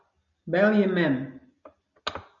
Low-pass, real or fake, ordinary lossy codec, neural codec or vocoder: 7.2 kHz; real; MP3, 96 kbps; none